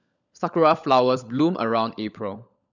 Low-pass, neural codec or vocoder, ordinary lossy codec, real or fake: 7.2 kHz; codec, 16 kHz, 16 kbps, FunCodec, trained on LibriTTS, 50 frames a second; none; fake